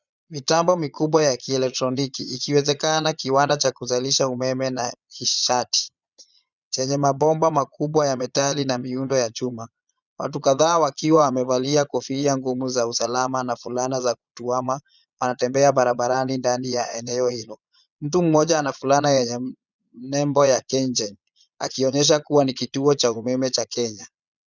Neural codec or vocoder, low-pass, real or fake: vocoder, 22.05 kHz, 80 mel bands, Vocos; 7.2 kHz; fake